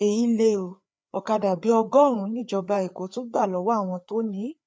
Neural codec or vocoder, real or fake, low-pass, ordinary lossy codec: codec, 16 kHz, 4 kbps, FreqCodec, larger model; fake; none; none